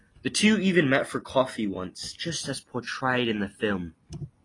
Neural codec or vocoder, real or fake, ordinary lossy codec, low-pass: none; real; AAC, 32 kbps; 10.8 kHz